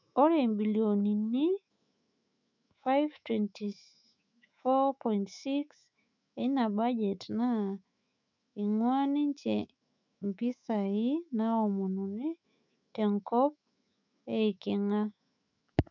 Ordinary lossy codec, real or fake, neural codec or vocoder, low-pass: none; fake; autoencoder, 48 kHz, 128 numbers a frame, DAC-VAE, trained on Japanese speech; 7.2 kHz